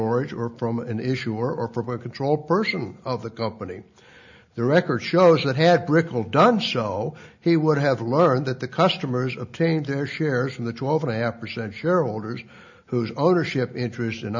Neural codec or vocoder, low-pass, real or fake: none; 7.2 kHz; real